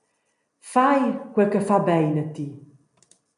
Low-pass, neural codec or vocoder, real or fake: 10.8 kHz; none; real